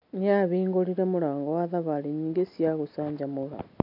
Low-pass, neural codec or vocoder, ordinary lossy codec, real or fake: 5.4 kHz; none; none; real